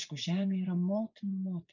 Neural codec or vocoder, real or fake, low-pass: vocoder, 24 kHz, 100 mel bands, Vocos; fake; 7.2 kHz